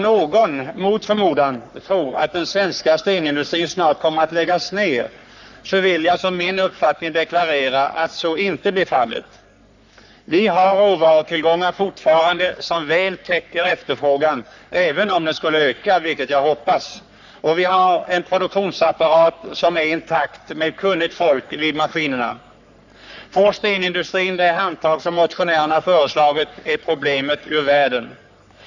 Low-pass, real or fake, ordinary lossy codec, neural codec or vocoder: 7.2 kHz; fake; none; codec, 44.1 kHz, 3.4 kbps, Pupu-Codec